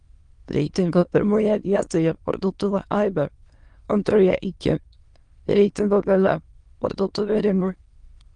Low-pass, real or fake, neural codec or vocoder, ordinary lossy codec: 9.9 kHz; fake; autoencoder, 22.05 kHz, a latent of 192 numbers a frame, VITS, trained on many speakers; Opus, 24 kbps